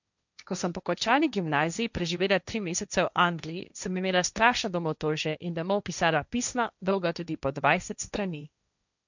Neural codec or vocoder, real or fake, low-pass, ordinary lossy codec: codec, 16 kHz, 1.1 kbps, Voila-Tokenizer; fake; 7.2 kHz; none